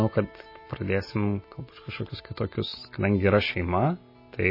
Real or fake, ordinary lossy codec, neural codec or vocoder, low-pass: real; MP3, 24 kbps; none; 5.4 kHz